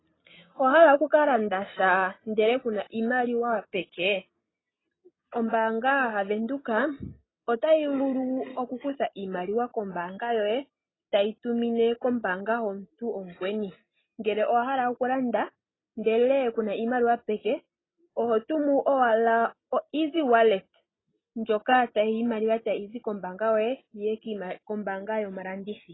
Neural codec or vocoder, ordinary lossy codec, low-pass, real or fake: none; AAC, 16 kbps; 7.2 kHz; real